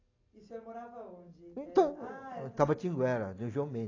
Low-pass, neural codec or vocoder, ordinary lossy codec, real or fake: 7.2 kHz; none; AAC, 32 kbps; real